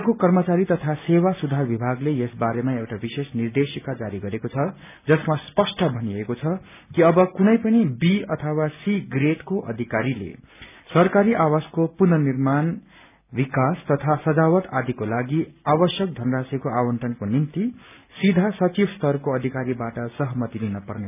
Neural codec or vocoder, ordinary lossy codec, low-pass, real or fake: none; none; 3.6 kHz; real